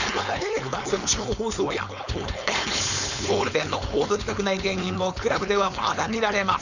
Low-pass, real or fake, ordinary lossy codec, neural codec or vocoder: 7.2 kHz; fake; none; codec, 16 kHz, 4.8 kbps, FACodec